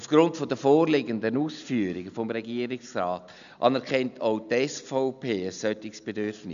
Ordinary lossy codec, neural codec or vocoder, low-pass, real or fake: none; none; 7.2 kHz; real